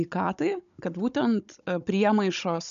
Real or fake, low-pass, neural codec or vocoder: fake; 7.2 kHz; codec, 16 kHz, 16 kbps, FunCodec, trained on Chinese and English, 50 frames a second